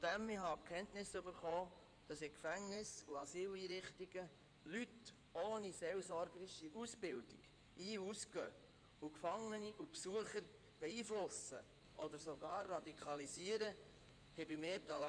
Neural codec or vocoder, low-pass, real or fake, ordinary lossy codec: codec, 16 kHz in and 24 kHz out, 2.2 kbps, FireRedTTS-2 codec; 9.9 kHz; fake; none